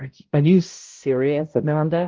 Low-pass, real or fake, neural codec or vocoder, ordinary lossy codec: 7.2 kHz; fake; codec, 16 kHz, 0.5 kbps, X-Codec, HuBERT features, trained on balanced general audio; Opus, 16 kbps